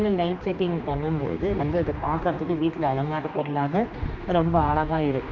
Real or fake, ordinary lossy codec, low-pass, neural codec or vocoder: fake; none; 7.2 kHz; codec, 16 kHz, 2 kbps, X-Codec, HuBERT features, trained on general audio